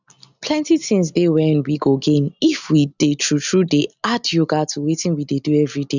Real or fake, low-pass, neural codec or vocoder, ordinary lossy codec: real; 7.2 kHz; none; none